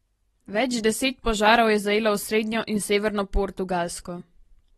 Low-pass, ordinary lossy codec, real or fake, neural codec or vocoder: 19.8 kHz; AAC, 32 kbps; real; none